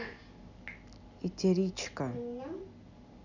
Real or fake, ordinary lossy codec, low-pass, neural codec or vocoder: real; none; 7.2 kHz; none